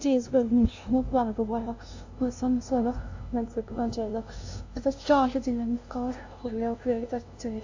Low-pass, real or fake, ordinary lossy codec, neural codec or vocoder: 7.2 kHz; fake; AAC, 48 kbps; codec, 16 kHz, 0.5 kbps, FunCodec, trained on LibriTTS, 25 frames a second